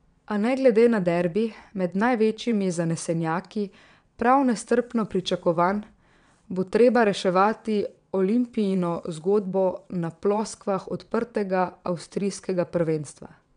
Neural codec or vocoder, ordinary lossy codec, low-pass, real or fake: vocoder, 22.05 kHz, 80 mel bands, WaveNeXt; MP3, 96 kbps; 9.9 kHz; fake